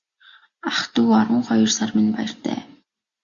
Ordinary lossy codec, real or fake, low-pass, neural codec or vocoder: Opus, 64 kbps; real; 7.2 kHz; none